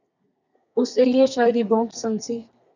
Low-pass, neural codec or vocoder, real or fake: 7.2 kHz; codec, 32 kHz, 1.9 kbps, SNAC; fake